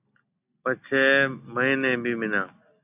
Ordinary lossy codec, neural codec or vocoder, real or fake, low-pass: AAC, 32 kbps; none; real; 3.6 kHz